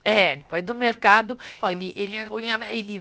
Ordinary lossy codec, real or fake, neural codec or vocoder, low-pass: none; fake; codec, 16 kHz, 0.7 kbps, FocalCodec; none